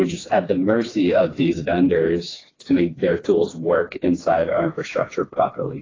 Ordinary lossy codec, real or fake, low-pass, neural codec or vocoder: AAC, 32 kbps; fake; 7.2 kHz; codec, 16 kHz, 2 kbps, FreqCodec, smaller model